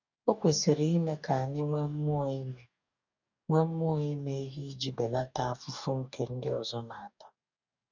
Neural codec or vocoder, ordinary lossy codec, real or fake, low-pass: codec, 44.1 kHz, 2.6 kbps, DAC; none; fake; 7.2 kHz